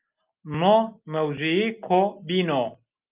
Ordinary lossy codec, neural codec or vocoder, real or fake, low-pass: Opus, 32 kbps; none; real; 3.6 kHz